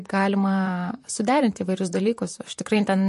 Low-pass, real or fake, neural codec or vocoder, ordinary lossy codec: 14.4 kHz; fake; vocoder, 44.1 kHz, 128 mel bands, Pupu-Vocoder; MP3, 48 kbps